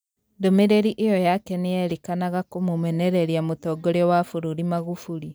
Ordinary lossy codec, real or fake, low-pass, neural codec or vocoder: none; real; none; none